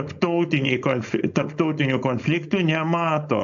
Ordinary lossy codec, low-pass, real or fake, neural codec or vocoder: AAC, 64 kbps; 7.2 kHz; fake; codec, 16 kHz, 4.8 kbps, FACodec